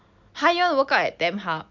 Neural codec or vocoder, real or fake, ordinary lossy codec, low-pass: none; real; MP3, 64 kbps; 7.2 kHz